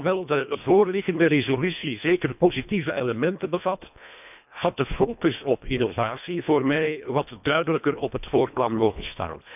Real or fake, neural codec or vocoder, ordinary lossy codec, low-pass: fake; codec, 24 kHz, 1.5 kbps, HILCodec; none; 3.6 kHz